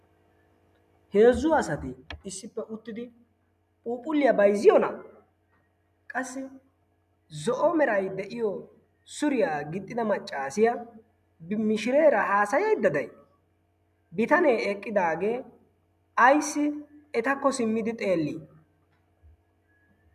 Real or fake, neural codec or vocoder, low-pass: real; none; 14.4 kHz